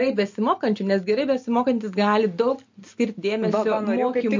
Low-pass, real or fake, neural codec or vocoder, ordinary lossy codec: 7.2 kHz; real; none; MP3, 48 kbps